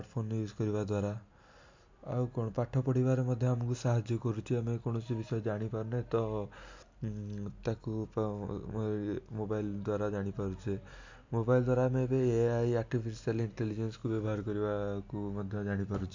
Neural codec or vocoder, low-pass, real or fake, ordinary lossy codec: none; 7.2 kHz; real; MP3, 64 kbps